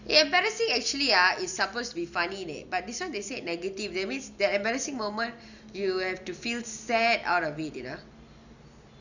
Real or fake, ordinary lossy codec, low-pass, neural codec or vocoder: real; none; 7.2 kHz; none